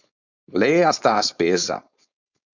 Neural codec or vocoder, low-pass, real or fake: codec, 16 kHz, 4.8 kbps, FACodec; 7.2 kHz; fake